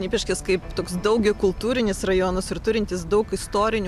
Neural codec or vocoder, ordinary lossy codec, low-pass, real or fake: none; AAC, 96 kbps; 14.4 kHz; real